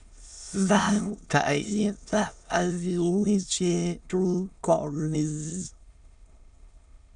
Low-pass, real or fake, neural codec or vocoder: 9.9 kHz; fake; autoencoder, 22.05 kHz, a latent of 192 numbers a frame, VITS, trained on many speakers